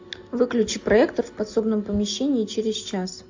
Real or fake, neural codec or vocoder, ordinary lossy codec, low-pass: real; none; AAC, 48 kbps; 7.2 kHz